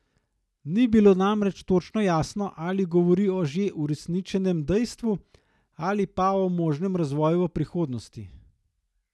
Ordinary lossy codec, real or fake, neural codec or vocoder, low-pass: none; real; none; none